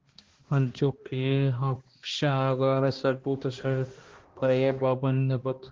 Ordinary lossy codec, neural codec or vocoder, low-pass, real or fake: Opus, 16 kbps; codec, 16 kHz, 1 kbps, X-Codec, HuBERT features, trained on balanced general audio; 7.2 kHz; fake